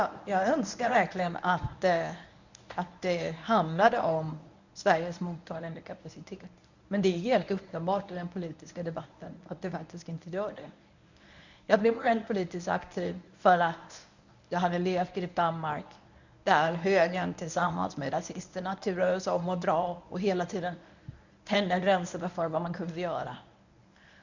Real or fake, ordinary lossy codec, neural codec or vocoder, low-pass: fake; none; codec, 24 kHz, 0.9 kbps, WavTokenizer, medium speech release version 1; 7.2 kHz